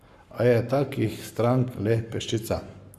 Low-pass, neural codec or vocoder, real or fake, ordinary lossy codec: 14.4 kHz; codec, 44.1 kHz, 7.8 kbps, Pupu-Codec; fake; Opus, 64 kbps